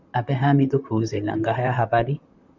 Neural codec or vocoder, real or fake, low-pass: vocoder, 44.1 kHz, 128 mel bands, Pupu-Vocoder; fake; 7.2 kHz